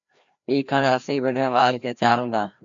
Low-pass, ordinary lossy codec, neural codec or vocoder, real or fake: 7.2 kHz; MP3, 64 kbps; codec, 16 kHz, 1 kbps, FreqCodec, larger model; fake